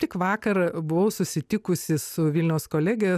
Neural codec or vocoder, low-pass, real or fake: none; 14.4 kHz; real